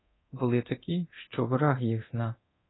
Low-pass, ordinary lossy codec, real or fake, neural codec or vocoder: 7.2 kHz; AAC, 16 kbps; fake; codec, 24 kHz, 0.9 kbps, DualCodec